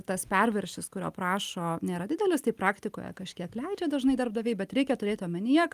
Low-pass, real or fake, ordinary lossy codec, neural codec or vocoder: 14.4 kHz; real; Opus, 32 kbps; none